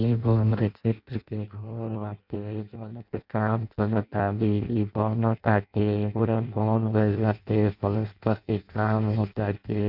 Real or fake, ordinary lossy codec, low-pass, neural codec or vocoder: fake; none; 5.4 kHz; codec, 16 kHz in and 24 kHz out, 0.6 kbps, FireRedTTS-2 codec